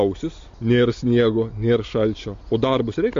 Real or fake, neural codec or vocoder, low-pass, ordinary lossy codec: real; none; 7.2 kHz; MP3, 48 kbps